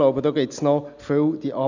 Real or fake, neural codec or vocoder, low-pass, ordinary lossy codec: real; none; 7.2 kHz; none